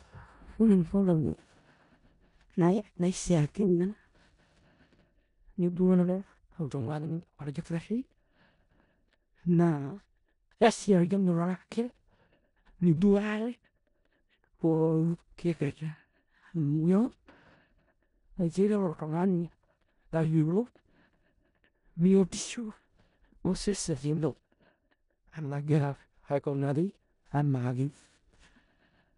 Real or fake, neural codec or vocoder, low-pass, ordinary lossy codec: fake; codec, 16 kHz in and 24 kHz out, 0.4 kbps, LongCat-Audio-Codec, four codebook decoder; 10.8 kHz; none